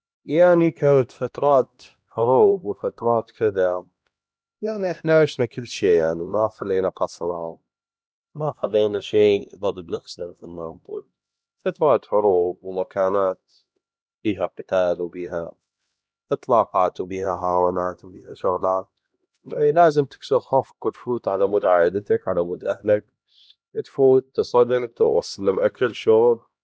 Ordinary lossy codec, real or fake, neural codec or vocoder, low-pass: none; fake; codec, 16 kHz, 1 kbps, X-Codec, HuBERT features, trained on LibriSpeech; none